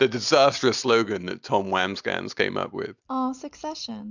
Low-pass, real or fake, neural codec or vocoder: 7.2 kHz; real; none